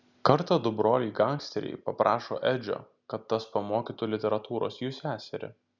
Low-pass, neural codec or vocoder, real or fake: 7.2 kHz; none; real